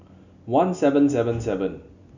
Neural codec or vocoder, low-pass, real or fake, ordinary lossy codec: none; 7.2 kHz; real; none